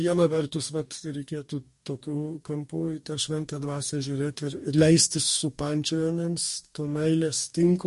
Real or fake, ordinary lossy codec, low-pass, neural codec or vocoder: fake; MP3, 48 kbps; 14.4 kHz; codec, 44.1 kHz, 2.6 kbps, DAC